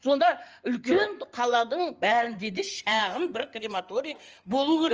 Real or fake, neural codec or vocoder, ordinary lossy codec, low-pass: fake; codec, 16 kHz in and 24 kHz out, 2.2 kbps, FireRedTTS-2 codec; Opus, 24 kbps; 7.2 kHz